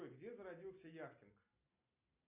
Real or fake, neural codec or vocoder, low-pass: real; none; 3.6 kHz